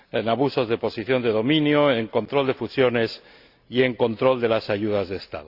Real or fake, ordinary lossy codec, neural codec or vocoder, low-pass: real; Opus, 64 kbps; none; 5.4 kHz